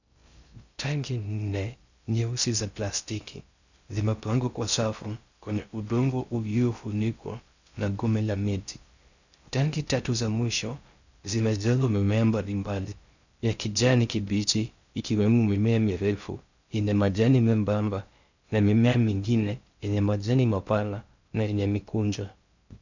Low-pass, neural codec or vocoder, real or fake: 7.2 kHz; codec, 16 kHz in and 24 kHz out, 0.6 kbps, FocalCodec, streaming, 2048 codes; fake